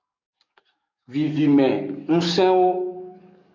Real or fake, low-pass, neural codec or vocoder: fake; 7.2 kHz; codec, 44.1 kHz, 7.8 kbps, DAC